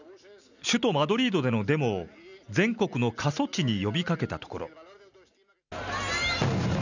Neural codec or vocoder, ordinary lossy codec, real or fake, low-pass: none; none; real; 7.2 kHz